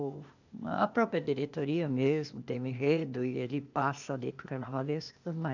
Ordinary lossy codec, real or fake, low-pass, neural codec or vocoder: none; fake; 7.2 kHz; codec, 16 kHz, 0.8 kbps, ZipCodec